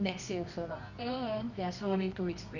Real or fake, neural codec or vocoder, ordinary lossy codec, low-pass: fake; codec, 24 kHz, 0.9 kbps, WavTokenizer, medium music audio release; none; 7.2 kHz